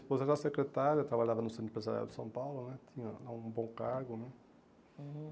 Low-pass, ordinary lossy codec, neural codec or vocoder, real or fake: none; none; none; real